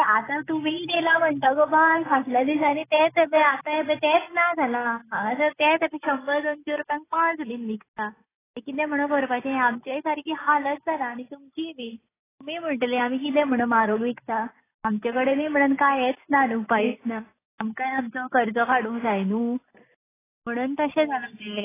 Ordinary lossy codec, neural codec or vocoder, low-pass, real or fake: AAC, 16 kbps; none; 3.6 kHz; real